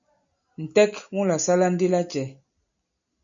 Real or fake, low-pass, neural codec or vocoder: real; 7.2 kHz; none